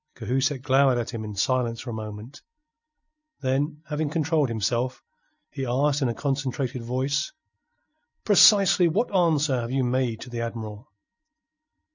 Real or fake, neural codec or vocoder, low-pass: real; none; 7.2 kHz